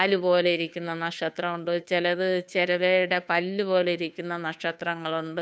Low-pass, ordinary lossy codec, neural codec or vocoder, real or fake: none; none; codec, 16 kHz, 2 kbps, FunCodec, trained on Chinese and English, 25 frames a second; fake